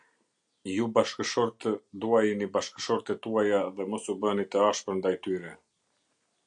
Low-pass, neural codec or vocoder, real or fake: 9.9 kHz; none; real